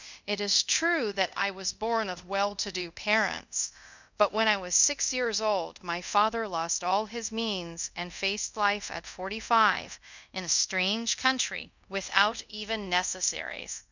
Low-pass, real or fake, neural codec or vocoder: 7.2 kHz; fake; codec, 24 kHz, 0.5 kbps, DualCodec